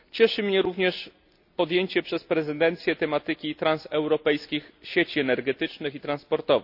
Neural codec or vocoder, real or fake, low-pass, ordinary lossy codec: none; real; 5.4 kHz; none